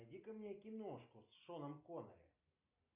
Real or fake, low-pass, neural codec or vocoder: real; 3.6 kHz; none